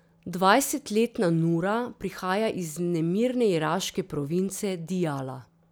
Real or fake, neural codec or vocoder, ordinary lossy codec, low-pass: real; none; none; none